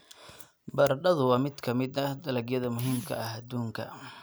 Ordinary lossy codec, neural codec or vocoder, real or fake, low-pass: none; none; real; none